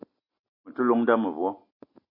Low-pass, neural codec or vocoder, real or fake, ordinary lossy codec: 5.4 kHz; none; real; MP3, 32 kbps